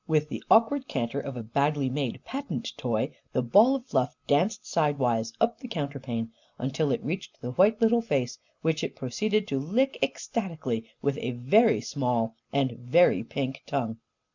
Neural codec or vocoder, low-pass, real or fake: none; 7.2 kHz; real